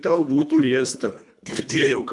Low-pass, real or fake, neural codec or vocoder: 10.8 kHz; fake; codec, 24 kHz, 1.5 kbps, HILCodec